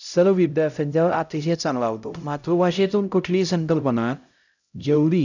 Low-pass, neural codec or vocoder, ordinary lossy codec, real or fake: 7.2 kHz; codec, 16 kHz, 0.5 kbps, X-Codec, HuBERT features, trained on LibriSpeech; none; fake